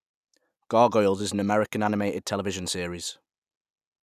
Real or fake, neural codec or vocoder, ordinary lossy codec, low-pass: real; none; none; 14.4 kHz